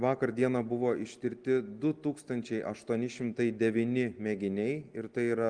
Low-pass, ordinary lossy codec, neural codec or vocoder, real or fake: 9.9 kHz; Opus, 24 kbps; none; real